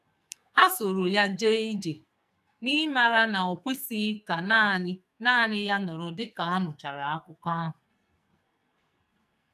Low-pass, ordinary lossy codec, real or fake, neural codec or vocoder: 14.4 kHz; none; fake; codec, 44.1 kHz, 2.6 kbps, SNAC